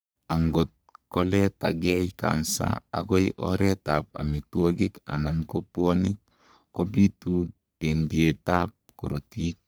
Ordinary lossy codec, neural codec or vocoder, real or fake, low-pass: none; codec, 44.1 kHz, 3.4 kbps, Pupu-Codec; fake; none